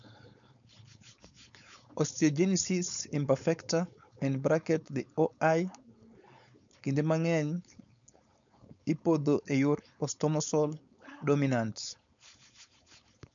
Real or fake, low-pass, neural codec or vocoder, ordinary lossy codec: fake; 7.2 kHz; codec, 16 kHz, 4.8 kbps, FACodec; none